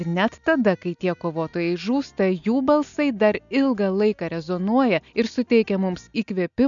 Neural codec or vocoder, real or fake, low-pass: none; real; 7.2 kHz